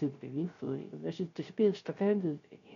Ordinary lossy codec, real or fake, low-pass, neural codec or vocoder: MP3, 48 kbps; fake; 7.2 kHz; codec, 16 kHz, 0.3 kbps, FocalCodec